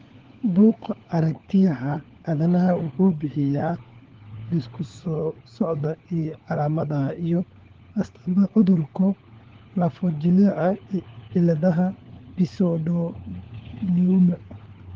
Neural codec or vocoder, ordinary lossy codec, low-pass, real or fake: codec, 16 kHz, 4 kbps, FunCodec, trained on LibriTTS, 50 frames a second; Opus, 16 kbps; 7.2 kHz; fake